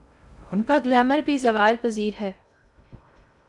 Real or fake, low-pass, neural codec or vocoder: fake; 10.8 kHz; codec, 16 kHz in and 24 kHz out, 0.6 kbps, FocalCodec, streaming, 2048 codes